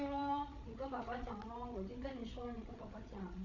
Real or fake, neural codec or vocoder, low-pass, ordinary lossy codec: fake; codec, 16 kHz, 8 kbps, FreqCodec, larger model; 7.2 kHz; none